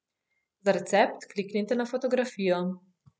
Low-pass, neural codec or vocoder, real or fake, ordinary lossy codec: none; none; real; none